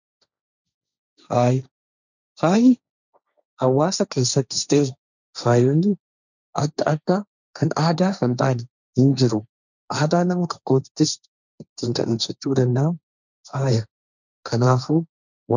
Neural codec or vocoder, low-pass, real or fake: codec, 16 kHz, 1.1 kbps, Voila-Tokenizer; 7.2 kHz; fake